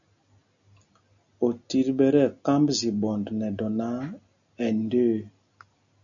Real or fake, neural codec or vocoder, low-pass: real; none; 7.2 kHz